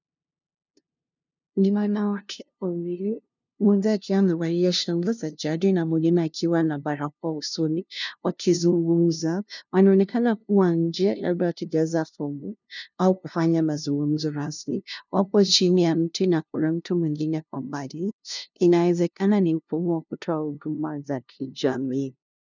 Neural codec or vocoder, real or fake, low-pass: codec, 16 kHz, 0.5 kbps, FunCodec, trained on LibriTTS, 25 frames a second; fake; 7.2 kHz